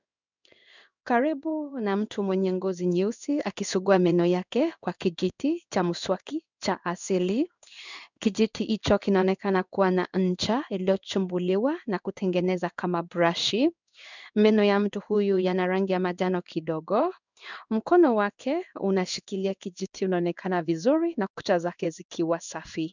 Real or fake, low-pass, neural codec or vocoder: fake; 7.2 kHz; codec, 16 kHz in and 24 kHz out, 1 kbps, XY-Tokenizer